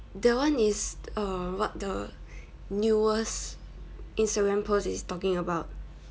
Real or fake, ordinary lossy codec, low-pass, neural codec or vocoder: real; none; none; none